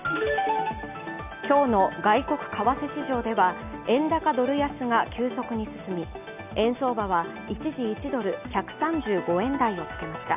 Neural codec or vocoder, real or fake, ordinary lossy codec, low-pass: none; real; none; 3.6 kHz